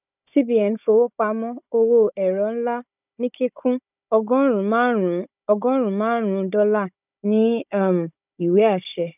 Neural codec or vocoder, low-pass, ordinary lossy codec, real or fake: codec, 16 kHz, 16 kbps, FunCodec, trained on Chinese and English, 50 frames a second; 3.6 kHz; none; fake